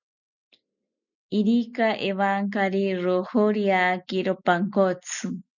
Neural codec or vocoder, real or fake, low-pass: none; real; 7.2 kHz